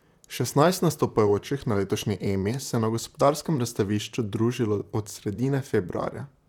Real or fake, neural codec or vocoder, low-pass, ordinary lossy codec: fake; vocoder, 44.1 kHz, 128 mel bands, Pupu-Vocoder; 19.8 kHz; none